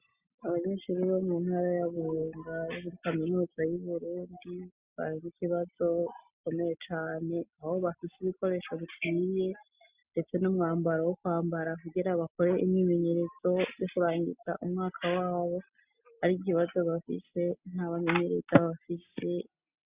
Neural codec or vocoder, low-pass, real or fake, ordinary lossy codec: none; 3.6 kHz; real; Opus, 64 kbps